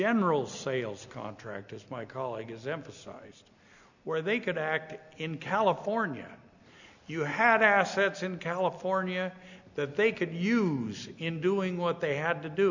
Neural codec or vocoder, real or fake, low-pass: none; real; 7.2 kHz